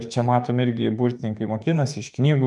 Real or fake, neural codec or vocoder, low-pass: fake; autoencoder, 48 kHz, 32 numbers a frame, DAC-VAE, trained on Japanese speech; 10.8 kHz